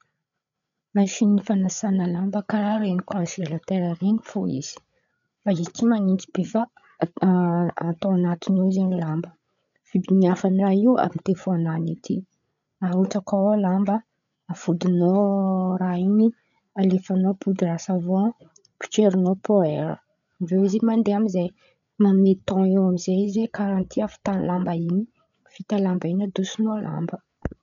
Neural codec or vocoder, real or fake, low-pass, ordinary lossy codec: codec, 16 kHz, 8 kbps, FreqCodec, larger model; fake; 7.2 kHz; none